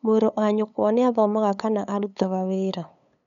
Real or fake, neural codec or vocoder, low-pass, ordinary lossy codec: fake; codec, 16 kHz, 8 kbps, FunCodec, trained on LibriTTS, 25 frames a second; 7.2 kHz; none